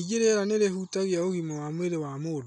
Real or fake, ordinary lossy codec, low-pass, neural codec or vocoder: real; none; 10.8 kHz; none